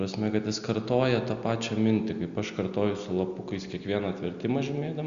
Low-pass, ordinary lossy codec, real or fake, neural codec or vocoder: 7.2 kHz; Opus, 64 kbps; real; none